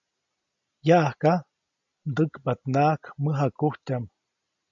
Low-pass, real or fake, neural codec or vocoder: 7.2 kHz; real; none